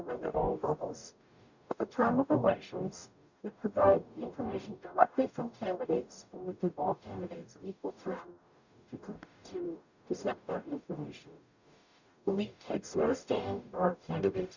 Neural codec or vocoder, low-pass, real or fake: codec, 44.1 kHz, 0.9 kbps, DAC; 7.2 kHz; fake